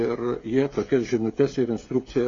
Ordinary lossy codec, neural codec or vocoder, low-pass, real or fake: AAC, 32 kbps; codec, 16 kHz, 16 kbps, FreqCodec, smaller model; 7.2 kHz; fake